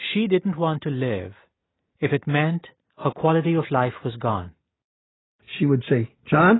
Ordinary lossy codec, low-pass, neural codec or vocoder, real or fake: AAC, 16 kbps; 7.2 kHz; none; real